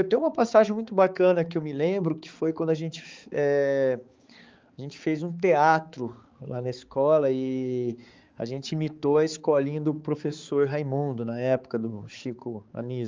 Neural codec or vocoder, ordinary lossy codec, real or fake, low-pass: codec, 16 kHz, 4 kbps, X-Codec, HuBERT features, trained on balanced general audio; Opus, 32 kbps; fake; 7.2 kHz